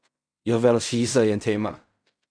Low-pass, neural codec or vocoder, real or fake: 9.9 kHz; codec, 16 kHz in and 24 kHz out, 0.4 kbps, LongCat-Audio-Codec, fine tuned four codebook decoder; fake